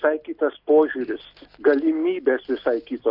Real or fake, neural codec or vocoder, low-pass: real; none; 5.4 kHz